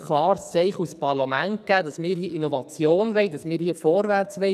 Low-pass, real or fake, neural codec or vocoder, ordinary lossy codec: 14.4 kHz; fake; codec, 44.1 kHz, 2.6 kbps, SNAC; none